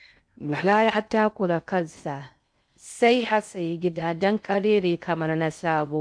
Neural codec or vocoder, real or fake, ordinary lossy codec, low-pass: codec, 16 kHz in and 24 kHz out, 0.6 kbps, FocalCodec, streaming, 2048 codes; fake; MP3, 64 kbps; 9.9 kHz